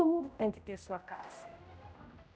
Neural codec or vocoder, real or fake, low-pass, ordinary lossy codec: codec, 16 kHz, 0.5 kbps, X-Codec, HuBERT features, trained on general audio; fake; none; none